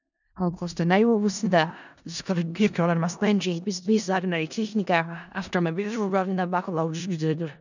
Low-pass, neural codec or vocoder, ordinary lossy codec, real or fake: 7.2 kHz; codec, 16 kHz in and 24 kHz out, 0.4 kbps, LongCat-Audio-Codec, four codebook decoder; none; fake